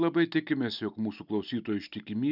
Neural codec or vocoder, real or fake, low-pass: none; real; 5.4 kHz